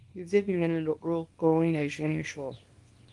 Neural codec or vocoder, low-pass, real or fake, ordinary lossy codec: codec, 24 kHz, 0.9 kbps, WavTokenizer, small release; 10.8 kHz; fake; Opus, 24 kbps